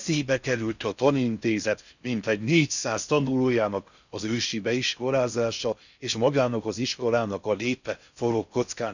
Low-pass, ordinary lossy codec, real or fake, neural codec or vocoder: 7.2 kHz; none; fake; codec, 16 kHz in and 24 kHz out, 0.6 kbps, FocalCodec, streaming, 4096 codes